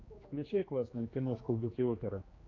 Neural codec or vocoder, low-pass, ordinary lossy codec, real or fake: codec, 16 kHz, 2 kbps, X-Codec, HuBERT features, trained on general audio; 7.2 kHz; AAC, 32 kbps; fake